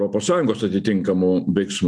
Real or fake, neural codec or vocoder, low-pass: real; none; 9.9 kHz